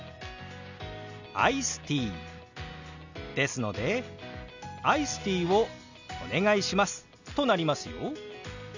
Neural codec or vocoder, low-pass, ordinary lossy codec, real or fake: none; 7.2 kHz; none; real